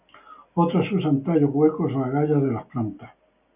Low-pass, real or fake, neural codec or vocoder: 3.6 kHz; real; none